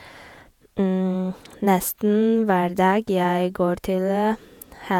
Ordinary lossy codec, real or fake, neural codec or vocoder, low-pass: none; fake; vocoder, 48 kHz, 128 mel bands, Vocos; 19.8 kHz